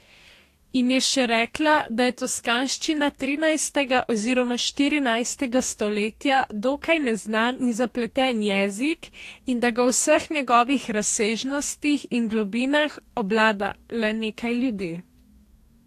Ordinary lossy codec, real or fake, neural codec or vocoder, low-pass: AAC, 64 kbps; fake; codec, 44.1 kHz, 2.6 kbps, DAC; 14.4 kHz